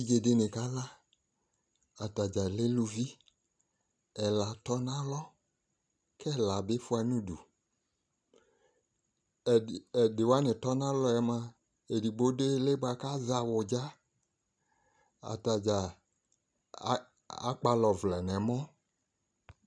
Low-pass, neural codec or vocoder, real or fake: 9.9 kHz; none; real